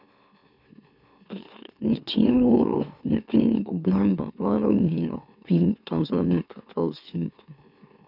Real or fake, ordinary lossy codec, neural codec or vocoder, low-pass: fake; none; autoencoder, 44.1 kHz, a latent of 192 numbers a frame, MeloTTS; 5.4 kHz